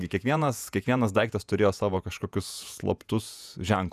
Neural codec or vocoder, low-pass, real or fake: vocoder, 48 kHz, 128 mel bands, Vocos; 14.4 kHz; fake